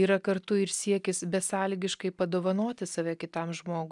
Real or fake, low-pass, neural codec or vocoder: real; 10.8 kHz; none